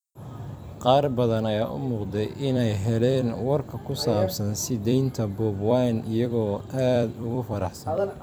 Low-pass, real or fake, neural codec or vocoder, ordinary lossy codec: none; fake; vocoder, 44.1 kHz, 128 mel bands every 256 samples, BigVGAN v2; none